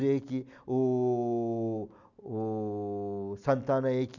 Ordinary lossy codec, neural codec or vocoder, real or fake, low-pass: none; none; real; 7.2 kHz